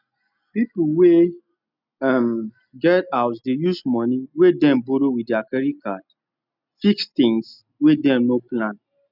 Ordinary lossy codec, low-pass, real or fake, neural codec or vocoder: none; 5.4 kHz; real; none